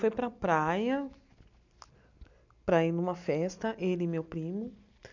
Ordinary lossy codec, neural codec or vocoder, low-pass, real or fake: none; none; 7.2 kHz; real